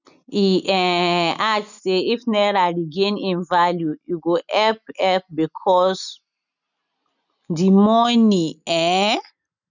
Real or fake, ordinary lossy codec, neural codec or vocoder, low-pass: real; none; none; 7.2 kHz